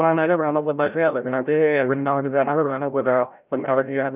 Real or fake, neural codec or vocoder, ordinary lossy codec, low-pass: fake; codec, 16 kHz, 0.5 kbps, FreqCodec, larger model; none; 3.6 kHz